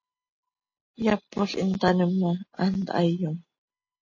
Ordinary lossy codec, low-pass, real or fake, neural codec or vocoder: MP3, 32 kbps; 7.2 kHz; real; none